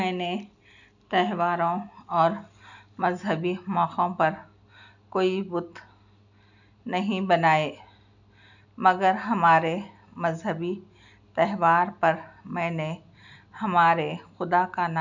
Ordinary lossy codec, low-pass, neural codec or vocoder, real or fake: none; 7.2 kHz; none; real